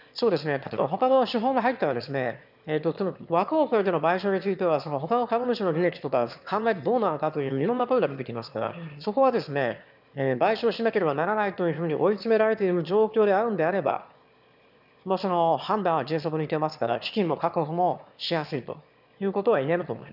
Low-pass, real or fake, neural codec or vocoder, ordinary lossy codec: 5.4 kHz; fake; autoencoder, 22.05 kHz, a latent of 192 numbers a frame, VITS, trained on one speaker; none